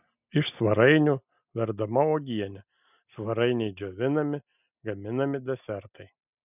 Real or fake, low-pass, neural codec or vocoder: real; 3.6 kHz; none